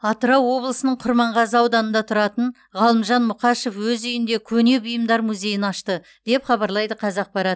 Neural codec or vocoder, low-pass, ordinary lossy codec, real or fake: none; none; none; real